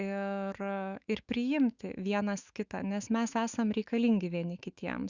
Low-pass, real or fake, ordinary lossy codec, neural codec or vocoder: 7.2 kHz; fake; Opus, 64 kbps; autoencoder, 48 kHz, 128 numbers a frame, DAC-VAE, trained on Japanese speech